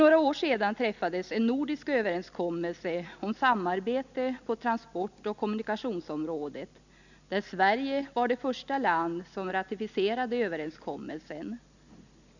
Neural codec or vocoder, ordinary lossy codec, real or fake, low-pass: none; none; real; 7.2 kHz